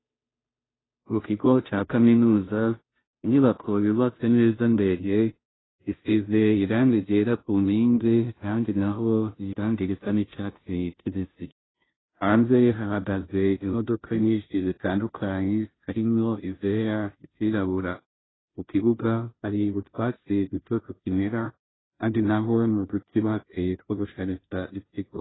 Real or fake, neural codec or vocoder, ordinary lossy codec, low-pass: fake; codec, 16 kHz, 0.5 kbps, FunCodec, trained on Chinese and English, 25 frames a second; AAC, 16 kbps; 7.2 kHz